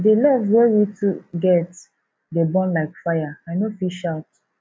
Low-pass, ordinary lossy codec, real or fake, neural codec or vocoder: none; none; real; none